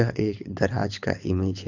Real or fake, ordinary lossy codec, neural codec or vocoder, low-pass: fake; none; codec, 24 kHz, 6 kbps, HILCodec; 7.2 kHz